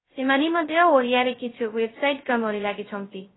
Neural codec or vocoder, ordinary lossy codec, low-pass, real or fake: codec, 16 kHz, 0.2 kbps, FocalCodec; AAC, 16 kbps; 7.2 kHz; fake